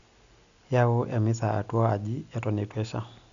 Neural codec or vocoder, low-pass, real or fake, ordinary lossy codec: none; 7.2 kHz; real; none